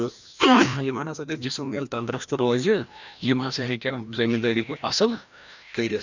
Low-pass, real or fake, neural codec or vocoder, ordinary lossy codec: 7.2 kHz; fake; codec, 16 kHz, 1 kbps, FreqCodec, larger model; none